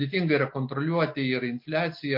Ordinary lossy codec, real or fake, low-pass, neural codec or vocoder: MP3, 32 kbps; real; 5.4 kHz; none